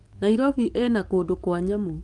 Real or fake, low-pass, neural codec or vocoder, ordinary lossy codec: fake; 10.8 kHz; codec, 44.1 kHz, 7.8 kbps, DAC; Opus, 32 kbps